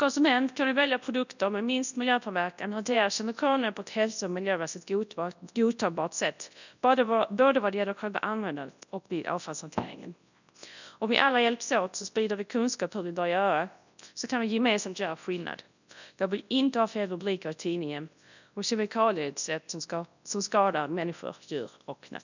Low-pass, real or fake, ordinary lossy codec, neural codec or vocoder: 7.2 kHz; fake; none; codec, 24 kHz, 0.9 kbps, WavTokenizer, large speech release